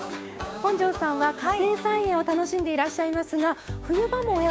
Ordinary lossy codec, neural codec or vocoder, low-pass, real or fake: none; codec, 16 kHz, 6 kbps, DAC; none; fake